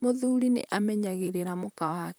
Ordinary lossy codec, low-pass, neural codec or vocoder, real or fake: none; none; vocoder, 44.1 kHz, 128 mel bands, Pupu-Vocoder; fake